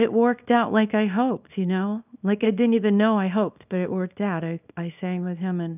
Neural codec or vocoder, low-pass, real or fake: codec, 24 kHz, 0.9 kbps, WavTokenizer, small release; 3.6 kHz; fake